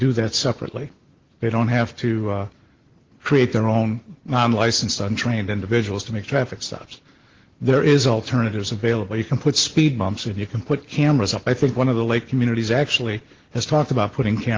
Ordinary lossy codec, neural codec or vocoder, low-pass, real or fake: Opus, 16 kbps; none; 7.2 kHz; real